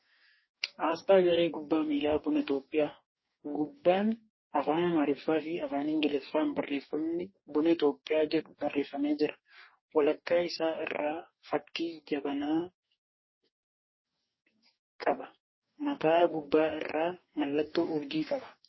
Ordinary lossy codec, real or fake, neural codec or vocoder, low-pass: MP3, 24 kbps; fake; codec, 44.1 kHz, 2.6 kbps, DAC; 7.2 kHz